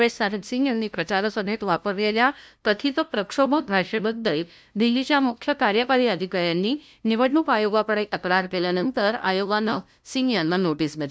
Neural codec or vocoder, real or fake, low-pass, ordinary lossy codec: codec, 16 kHz, 0.5 kbps, FunCodec, trained on LibriTTS, 25 frames a second; fake; none; none